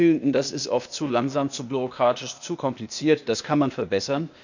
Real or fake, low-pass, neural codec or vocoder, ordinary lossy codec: fake; 7.2 kHz; codec, 16 kHz, 0.8 kbps, ZipCodec; none